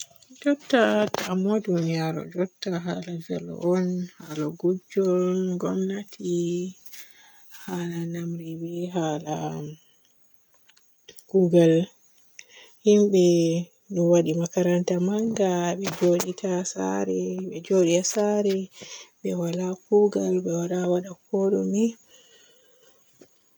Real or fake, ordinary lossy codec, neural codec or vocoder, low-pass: real; none; none; none